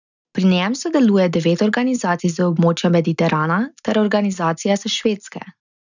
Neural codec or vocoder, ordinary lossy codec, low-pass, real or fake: none; none; 7.2 kHz; real